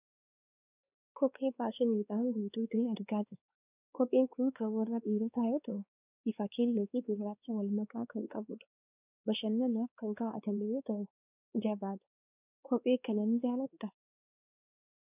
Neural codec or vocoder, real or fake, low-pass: codec, 16 kHz, 2 kbps, X-Codec, WavLM features, trained on Multilingual LibriSpeech; fake; 3.6 kHz